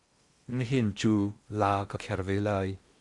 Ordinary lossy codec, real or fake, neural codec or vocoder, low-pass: Opus, 64 kbps; fake; codec, 16 kHz in and 24 kHz out, 0.8 kbps, FocalCodec, streaming, 65536 codes; 10.8 kHz